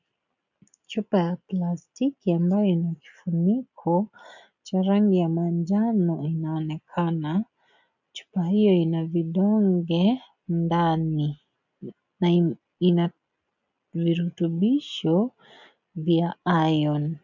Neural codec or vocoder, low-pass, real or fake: none; 7.2 kHz; real